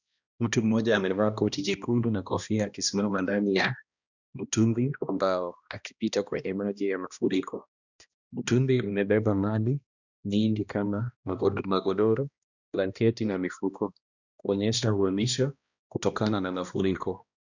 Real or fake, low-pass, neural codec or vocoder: fake; 7.2 kHz; codec, 16 kHz, 1 kbps, X-Codec, HuBERT features, trained on balanced general audio